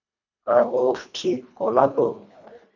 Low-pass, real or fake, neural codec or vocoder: 7.2 kHz; fake; codec, 24 kHz, 1.5 kbps, HILCodec